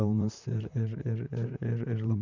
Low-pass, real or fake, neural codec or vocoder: 7.2 kHz; fake; vocoder, 44.1 kHz, 128 mel bands, Pupu-Vocoder